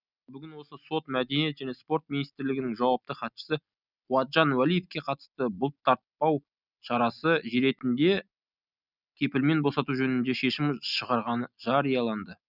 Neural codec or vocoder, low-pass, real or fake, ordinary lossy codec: none; 5.4 kHz; real; none